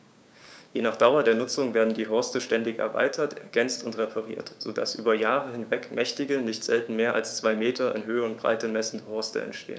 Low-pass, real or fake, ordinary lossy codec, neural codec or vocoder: none; fake; none; codec, 16 kHz, 6 kbps, DAC